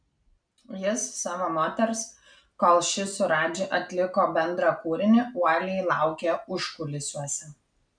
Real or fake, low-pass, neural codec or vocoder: real; 9.9 kHz; none